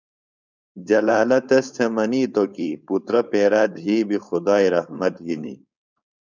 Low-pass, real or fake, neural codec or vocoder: 7.2 kHz; fake; codec, 16 kHz, 4.8 kbps, FACodec